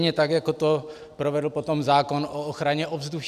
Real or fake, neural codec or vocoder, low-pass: real; none; 14.4 kHz